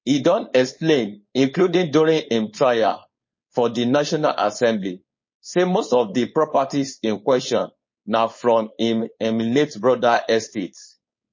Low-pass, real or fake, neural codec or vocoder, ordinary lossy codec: 7.2 kHz; fake; codec, 16 kHz, 4.8 kbps, FACodec; MP3, 32 kbps